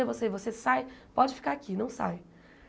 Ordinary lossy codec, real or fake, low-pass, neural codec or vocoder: none; real; none; none